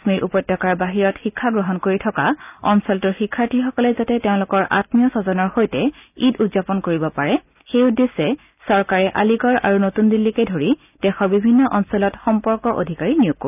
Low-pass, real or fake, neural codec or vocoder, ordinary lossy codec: 3.6 kHz; real; none; none